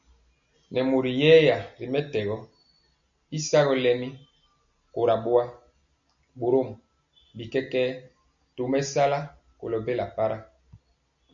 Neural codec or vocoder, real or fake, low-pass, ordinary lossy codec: none; real; 7.2 kHz; MP3, 96 kbps